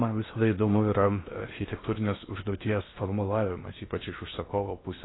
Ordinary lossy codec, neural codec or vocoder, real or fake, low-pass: AAC, 16 kbps; codec, 16 kHz in and 24 kHz out, 0.6 kbps, FocalCodec, streaming, 4096 codes; fake; 7.2 kHz